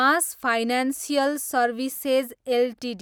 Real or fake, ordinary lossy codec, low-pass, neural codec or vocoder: real; none; none; none